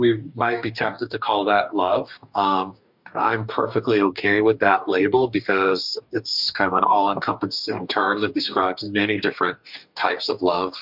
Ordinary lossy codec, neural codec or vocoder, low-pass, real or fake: MP3, 48 kbps; codec, 44.1 kHz, 2.6 kbps, DAC; 5.4 kHz; fake